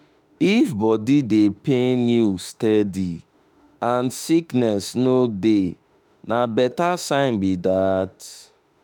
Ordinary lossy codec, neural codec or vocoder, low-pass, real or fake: none; autoencoder, 48 kHz, 32 numbers a frame, DAC-VAE, trained on Japanese speech; none; fake